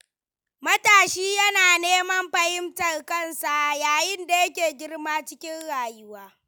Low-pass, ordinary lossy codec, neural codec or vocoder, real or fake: none; none; none; real